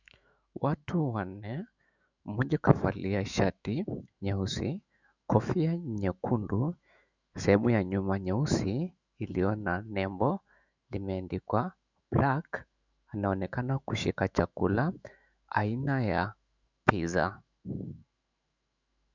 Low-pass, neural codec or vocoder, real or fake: 7.2 kHz; autoencoder, 48 kHz, 128 numbers a frame, DAC-VAE, trained on Japanese speech; fake